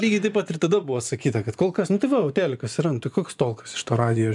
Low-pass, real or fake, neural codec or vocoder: 10.8 kHz; fake; vocoder, 24 kHz, 100 mel bands, Vocos